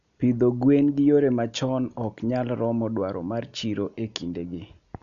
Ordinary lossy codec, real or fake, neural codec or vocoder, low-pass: none; real; none; 7.2 kHz